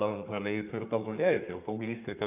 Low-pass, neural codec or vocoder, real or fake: 3.6 kHz; codec, 32 kHz, 1.9 kbps, SNAC; fake